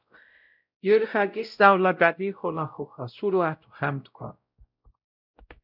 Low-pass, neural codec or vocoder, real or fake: 5.4 kHz; codec, 16 kHz, 0.5 kbps, X-Codec, WavLM features, trained on Multilingual LibriSpeech; fake